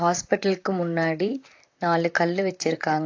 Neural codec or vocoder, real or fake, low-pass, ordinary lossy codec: vocoder, 44.1 kHz, 128 mel bands, Pupu-Vocoder; fake; 7.2 kHz; AAC, 48 kbps